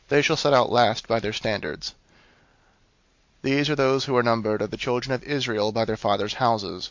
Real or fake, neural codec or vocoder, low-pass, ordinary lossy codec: real; none; 7.2 kHz; MP3, 48 kbps